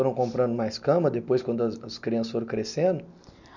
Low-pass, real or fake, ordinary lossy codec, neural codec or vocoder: 7.2 kHz; real; none; none